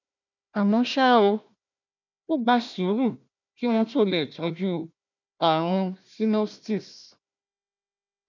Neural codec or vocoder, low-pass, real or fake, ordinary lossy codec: codec, 16 kHz, 1 kbps, FunCodec, trained on Chinese and English, 50 frames a second; 7.2 kHz; fake; none